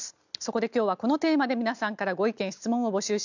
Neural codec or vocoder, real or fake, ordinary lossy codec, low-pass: none; real; none; 7.2 kHz